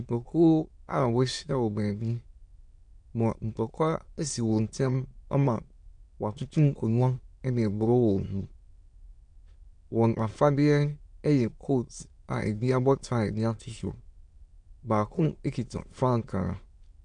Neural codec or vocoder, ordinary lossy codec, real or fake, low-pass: autoencoder, 22.05 kHz, a latent of 192 numbers a frame, VITS, trained on many speakers; MP3, 64 kbps; fake; 9.9 kHz